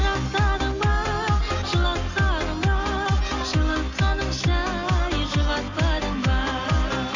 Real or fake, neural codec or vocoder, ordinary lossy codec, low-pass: real; none; AAC, 48 kbps; 7.2 kHz